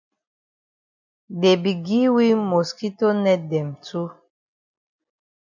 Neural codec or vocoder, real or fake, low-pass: none; real; 7.2 kHz